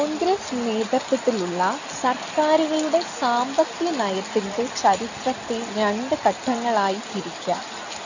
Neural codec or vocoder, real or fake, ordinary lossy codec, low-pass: none; real; none; 7.2 kHz